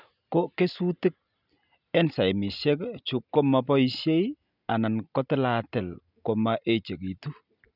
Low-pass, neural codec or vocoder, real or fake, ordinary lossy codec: 5.4 kHz; none; real; none